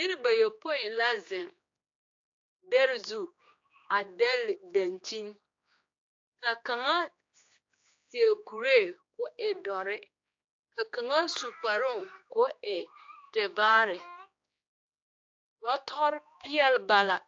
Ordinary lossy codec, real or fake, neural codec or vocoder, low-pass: AAC, 48 kbps; fake; codec, 16 kHz, 2 kbps, X-Codec, HuBERT features, trained on general audio; 7.2 kHz